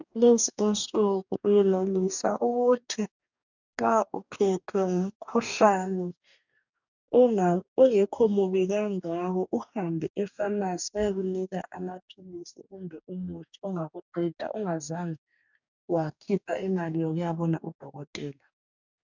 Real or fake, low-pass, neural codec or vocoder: fake; 7.2 kHz; codec, 44.1 kHz, 2.6 kbps, DAC